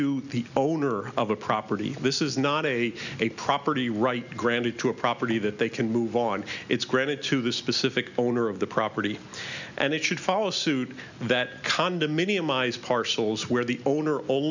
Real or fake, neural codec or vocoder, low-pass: real; none; 7.2 kHz